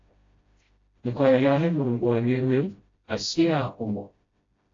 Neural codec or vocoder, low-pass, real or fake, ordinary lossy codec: codec, 16 kHz, 0.5 kbps, FreqCodec, smaller model; 7.2 kHz; fake; AAC, 32 kbps